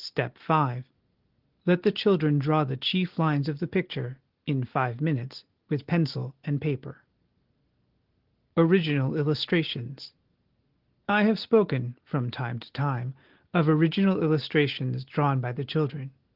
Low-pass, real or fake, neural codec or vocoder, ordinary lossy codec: 5.4 kHz; real; none; Opus, 16 kbps